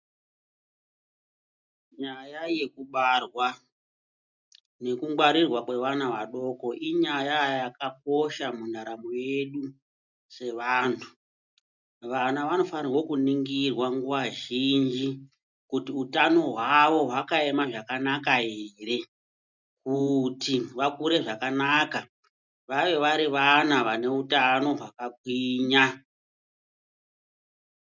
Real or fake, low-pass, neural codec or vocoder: real; 7.2 kHz; none